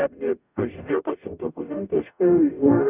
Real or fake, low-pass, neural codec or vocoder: fake; 3.6 kHz; codec, 44.1 kHz, 0.9 kbps, DAC